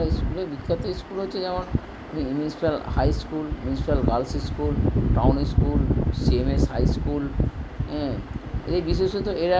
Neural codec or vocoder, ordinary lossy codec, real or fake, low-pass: none; none; real; none